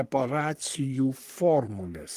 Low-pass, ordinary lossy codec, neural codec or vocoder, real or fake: 14.4 kHz; Opus, 32 kbps; codec, 44.1 kHz, 3.4 kbps, Pupu-Codec; fake